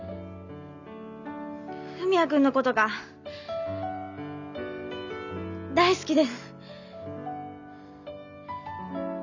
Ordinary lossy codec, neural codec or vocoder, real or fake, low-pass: none; none; real; 7.2 kHz